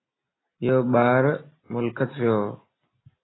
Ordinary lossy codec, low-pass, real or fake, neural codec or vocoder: AAC, 16 kbps; 7.2 kHz; real; none